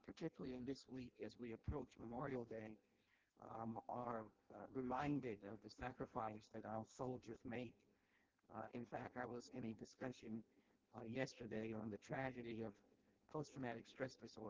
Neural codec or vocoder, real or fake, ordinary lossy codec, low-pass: codec, 16 kHz in and 24 kHz out, 0.6 kbps, FireRedTTS-2 codec; fake; Opus, 16 kbps; 7.2 kHz